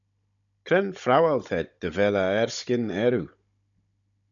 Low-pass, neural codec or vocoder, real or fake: 7.2 kHz; codec, 16 kHz, 16 kbps, FunCodec, trained on Chinese and English, 50 frames a second; fake